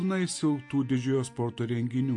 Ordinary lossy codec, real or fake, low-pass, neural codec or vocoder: MP3, 48 kbps; real; 10.8 kHz; none